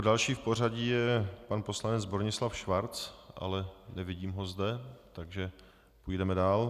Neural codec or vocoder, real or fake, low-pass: none; real; 14.4 kHz